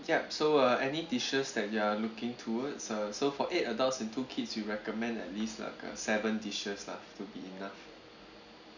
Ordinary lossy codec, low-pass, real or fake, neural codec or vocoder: none; 7.2 kHz; real; none